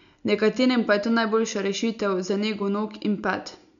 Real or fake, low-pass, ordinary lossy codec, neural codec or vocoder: real; 7.2 kHz; none; none